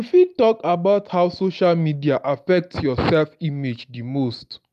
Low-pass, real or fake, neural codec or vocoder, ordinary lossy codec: 14.4 kHz; real; none; none